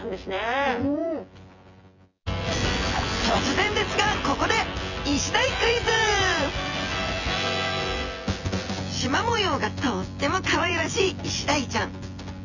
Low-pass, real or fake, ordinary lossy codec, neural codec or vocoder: 7.2 kHz; fake; none; vocoder, 24 kHz, 100 mel bands, Vocos